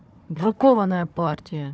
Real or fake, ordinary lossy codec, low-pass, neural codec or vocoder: fake; none; none; codec, 16 kHz, 4 kbps, FunCodec, trained on Chinese and English, 50 frames a second